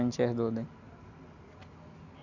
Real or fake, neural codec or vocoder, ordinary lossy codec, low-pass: real; none; none; 7.2 kHz